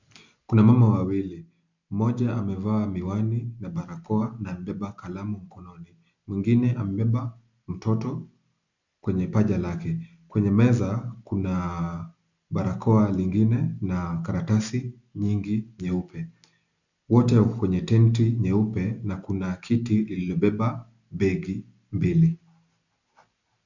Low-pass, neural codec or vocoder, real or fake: 7.2 kHz; none; real